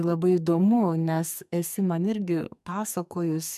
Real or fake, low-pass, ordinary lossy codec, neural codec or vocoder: fake; 14.4 kHz; MP3, 96 kbps; codec, 44.1 kHz, 2.6 kbps, SNAC